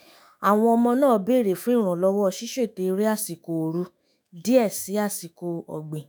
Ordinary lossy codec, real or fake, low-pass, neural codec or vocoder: none; fake; none; autoencoder, 48 kHz, 128 numbers a frame, DAC-VAE, trained on Japanese speech